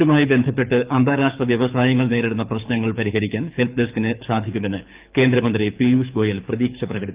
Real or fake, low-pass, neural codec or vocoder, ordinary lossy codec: fake; 3.6 kHz; codec, 24 kHz, 6 kbps, HILCodec; Opus, 24 kbps